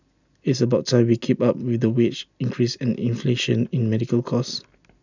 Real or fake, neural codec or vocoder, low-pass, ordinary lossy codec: real; none; 7.2 kHz; none